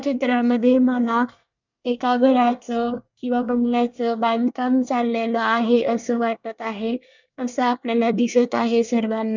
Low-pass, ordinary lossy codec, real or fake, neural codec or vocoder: 7.2 kHz; none; fake; codec, 24 kHz, 1 kbps, SNAC